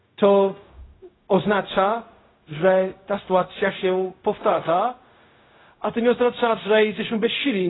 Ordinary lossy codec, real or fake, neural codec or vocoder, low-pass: AAC, 16 kbps; fake; codec, 16 kHz, 0.4 kbps, LongCat-Audio-Codec; 7.2 kHz